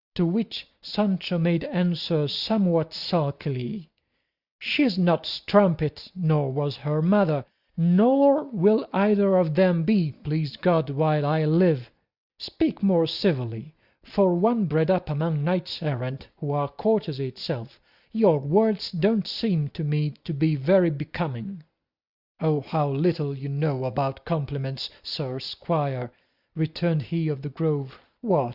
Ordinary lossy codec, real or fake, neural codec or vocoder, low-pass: Opus, 64 kbps; real; none; 5.4 kHz